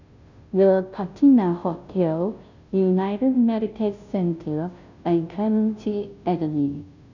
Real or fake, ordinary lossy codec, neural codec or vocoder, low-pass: fake; none; codec, 16 kHz, 0.5 kbps, FunCodec, trained on Chinese and English, 25 frames a second; 7.2 kHz